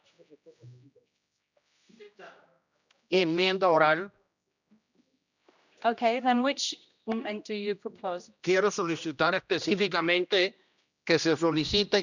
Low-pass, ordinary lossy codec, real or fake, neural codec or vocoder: 7.2 kHz; none; fake; codec, 16 kHz, 1 kbps, X-Codec, HuBERT features, trained on general audio